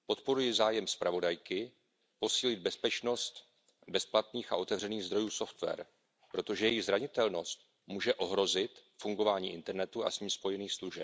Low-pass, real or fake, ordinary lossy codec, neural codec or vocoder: none; real; none; none